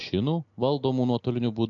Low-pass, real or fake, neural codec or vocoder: 7.2 kHz; real; none